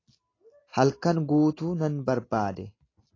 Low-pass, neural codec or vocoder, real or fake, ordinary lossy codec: 7.2 kHz; none; real; AAC, 32 kbps